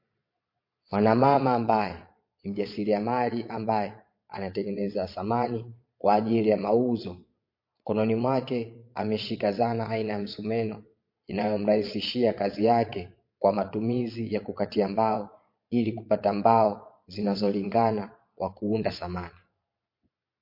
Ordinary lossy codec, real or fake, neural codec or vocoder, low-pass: MP3, 32 kbps; fake; vocoder, 22.05 kHz, 80 mel bands, Vocos; 5.4 kHz